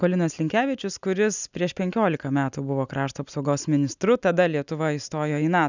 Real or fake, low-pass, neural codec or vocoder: real; 7.2 kHz; none